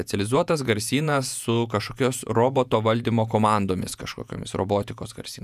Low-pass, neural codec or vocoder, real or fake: 14.4 kHz; vocoder, 48 kHz, 128 mel bands, Vocos; fake